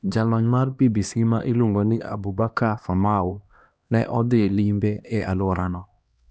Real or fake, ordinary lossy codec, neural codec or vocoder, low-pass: fake; none; codec, 16 kHz, 1 kbps, X-Codec, HuBERT features, trained on LibriSpeech; none